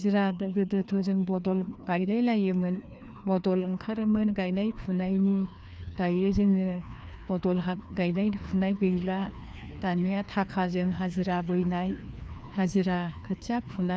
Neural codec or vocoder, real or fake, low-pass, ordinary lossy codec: codec, 16 kHz, 2 kbps, FreqCodec, larger model; fake; none; none